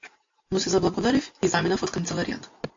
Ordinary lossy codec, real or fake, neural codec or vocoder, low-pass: AAC, 48 kbps; real; none; 7.2 kHz